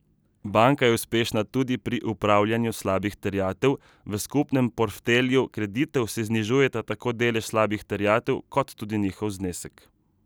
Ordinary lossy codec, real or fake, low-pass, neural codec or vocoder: none; real; none; none